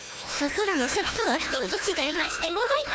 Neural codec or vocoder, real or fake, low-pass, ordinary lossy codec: codec, 16 kHz, 1 kbps, FunCodec, trained on Chinese and English, 50 frames a second; fake; none; none